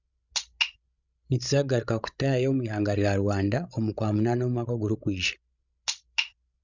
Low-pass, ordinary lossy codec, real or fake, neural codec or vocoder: 7.2 kHz; Opus, 64 kbps; fake; codec, 16 kHz, 16 kbps, FreqCodec, larger model